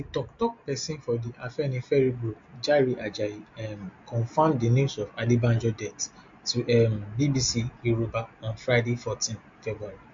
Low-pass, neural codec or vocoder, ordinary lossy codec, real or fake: 7.2 kHz; none; MP3, 48 kbps; real